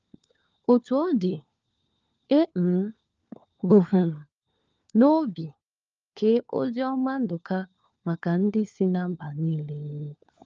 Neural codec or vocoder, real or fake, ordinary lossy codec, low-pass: codec, 16 kHz, 4 kbps, FunCodec, trained on LibriTTS, 50 frames a second; fake; Opus, 24 kbps; 7.2 kHz